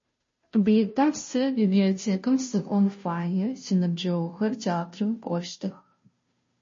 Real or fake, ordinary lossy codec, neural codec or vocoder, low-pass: fake; MP3, 32 kbps; codec, 16 kHz, 0.5 kbps, FunCodec, trained on Chinese and English, 25 frames a second; 7.2 kHz